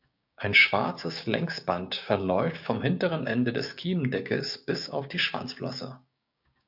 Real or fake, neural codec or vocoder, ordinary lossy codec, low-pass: fake; codec, 16 kHz, 6 kbps, DAC; AAC, 48 kbps; 5.4 kHz